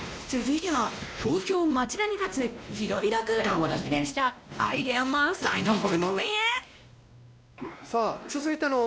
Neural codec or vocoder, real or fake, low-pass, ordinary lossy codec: codec, 16 kHz, 1 kbps, X-Codec, WavLM features, trained on Multilingual LibriSpeech; fake; none; none